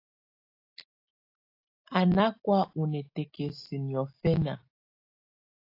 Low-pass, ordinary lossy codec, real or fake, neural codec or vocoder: 5.4 kHz; AAC, 32 kbps; real; none